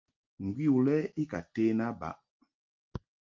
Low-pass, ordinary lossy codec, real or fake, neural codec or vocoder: 7.2 kHz; Opus, 24 kbps; real; none